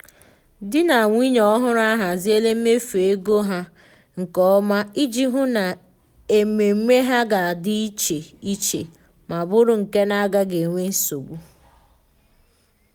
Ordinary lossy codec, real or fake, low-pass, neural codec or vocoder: none; real; none; none